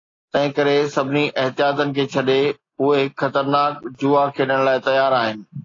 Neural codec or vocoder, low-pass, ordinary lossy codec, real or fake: none; 7.2 kHz; AAC, 32 kbps; real